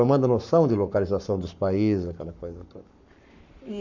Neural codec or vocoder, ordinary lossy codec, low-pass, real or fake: codec, 44.1 kHz, 7.8 kbps, Pupu-Codec; none; 7.2 kHz; fake